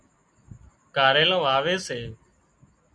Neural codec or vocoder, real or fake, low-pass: none; real; 9.9 kHz